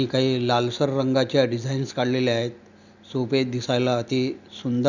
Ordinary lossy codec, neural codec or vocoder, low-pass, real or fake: none; none; 7.2 kHz; real